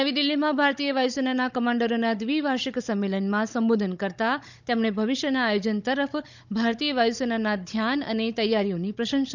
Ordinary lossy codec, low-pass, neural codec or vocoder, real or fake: none; 7.2 kHz; codec, 16 kHz, 16 kbps, FunCodec, trained on Chinese and English, 50 frames a second; fake